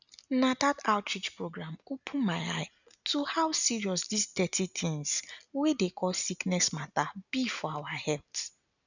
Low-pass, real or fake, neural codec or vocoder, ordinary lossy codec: 7.2 kHz; real; none; none